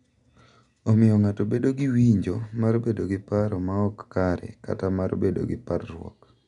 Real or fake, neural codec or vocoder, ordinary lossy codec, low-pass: real; none; none; 10.8 kHz